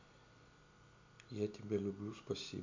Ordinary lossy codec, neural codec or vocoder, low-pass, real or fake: MP3, 64 kbps; none; 7.2 kHz; real